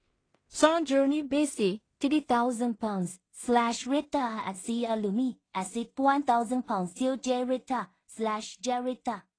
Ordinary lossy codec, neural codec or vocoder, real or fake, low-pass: AAC, 32 kbps; codec, 16 kHz in and 24 kHz out, 0.4 kbps, LongCat-Audio-Codec, two codebook decoder; fake; 9.9 kHz